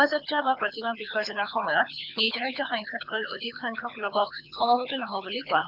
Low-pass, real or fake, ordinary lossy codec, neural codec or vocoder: 5.4 kHz; fake; none; codec, 24 kHz, 6 kbps, HILCodec